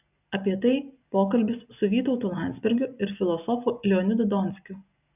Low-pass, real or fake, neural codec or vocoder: 3.6 kHz; real; none